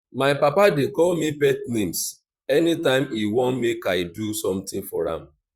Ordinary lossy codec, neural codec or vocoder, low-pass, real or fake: Opus, 64 kbps; vocoder, 44.1 kHz, 128 mel bands, Pupu-Vocoder; 19.8 kHz; fake